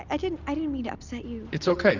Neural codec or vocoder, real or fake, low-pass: none; real; 7.2 kHz